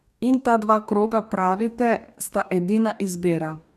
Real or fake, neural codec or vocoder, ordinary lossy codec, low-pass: fake; codec, 44.1 kHz, 2.6 kbps, DAC; none; 14.4 kHz